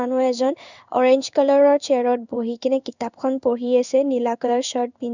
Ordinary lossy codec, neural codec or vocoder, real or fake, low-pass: none; codec, 16 kHz in and 24 kHz out, 1 kbps, XY-Tokenizer; fake; 7.2 kHz